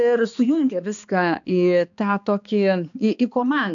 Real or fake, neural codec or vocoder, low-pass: fake; codec, 16 kHz, 2 kbps, X-Codec, HuBERT features, trained on balanced general audio; 7.2 kHz